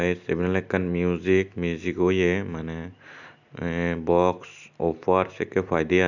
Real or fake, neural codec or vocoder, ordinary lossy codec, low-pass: real; none; none; 7.2 kHz